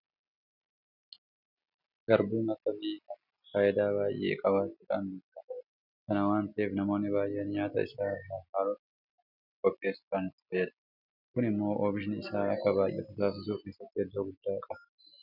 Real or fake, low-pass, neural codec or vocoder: real; 5.4 kHz; none